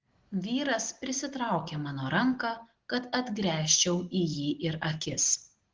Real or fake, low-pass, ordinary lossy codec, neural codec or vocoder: real; 7.2 kHz; Opus, 16 kbps; none